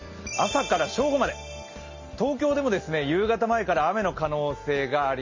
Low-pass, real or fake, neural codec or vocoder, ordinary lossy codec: 7.2 kHz; real; none; MP3, 32 kbps